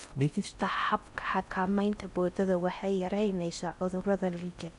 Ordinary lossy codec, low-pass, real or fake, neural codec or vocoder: none; 10.8 kHz; fake; codec, 16 kHz in and 24 kHz out, 0.6 kbps, FocalCodec, streaming, 4096 codes